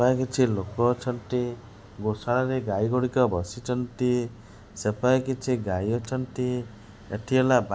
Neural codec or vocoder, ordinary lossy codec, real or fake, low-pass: none; none; real; none